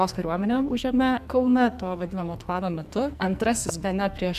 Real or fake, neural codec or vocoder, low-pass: fake; codec, 44.1 kHz, 2.6 kbps, SNAC; 14.4 kHz